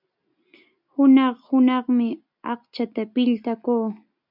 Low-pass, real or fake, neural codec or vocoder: 5.4 kHz; real; none